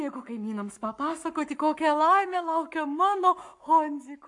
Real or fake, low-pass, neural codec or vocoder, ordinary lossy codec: fake; 10.8 kHz; codec, 44.1 kHz, 7.8 kbps, Pupu-Codec; MP3, 64 kbps